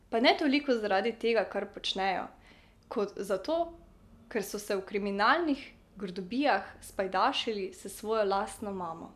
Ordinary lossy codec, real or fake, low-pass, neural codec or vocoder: none; real; 14.4 kHz; none